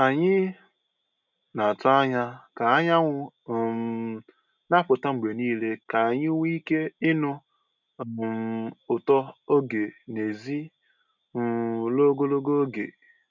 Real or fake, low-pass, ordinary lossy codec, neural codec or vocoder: real; 7.2 kHz; none; none